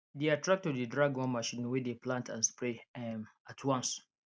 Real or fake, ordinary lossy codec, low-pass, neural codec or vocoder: real; none; none; none